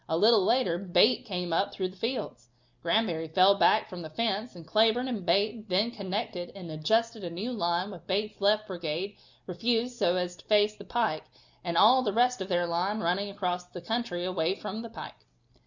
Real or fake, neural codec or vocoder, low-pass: real; none; 7.2 kHz